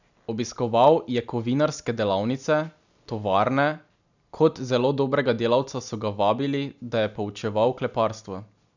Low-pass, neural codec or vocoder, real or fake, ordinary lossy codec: 7.2 kHz; none; real; none